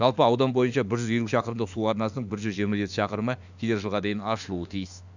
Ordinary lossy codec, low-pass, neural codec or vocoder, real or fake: none; 7.2 kHz; autoencoder, 48 kHz, 32 numbers a frame, DAC-VAE, trained on Japanese speech; fake